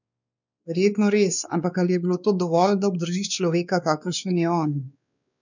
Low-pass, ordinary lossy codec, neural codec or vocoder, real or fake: 7.2 kHz; none; codec, 16 kHz, 2 kbps, X-Codec, WavLM features, trained on Multilingual LibriSpeech; fake